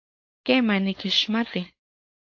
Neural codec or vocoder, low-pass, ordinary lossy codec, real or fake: codec, 16 kHz, 4.8 kbps, FACodec; 7.2 kHz; AAC, 48 kbps; fake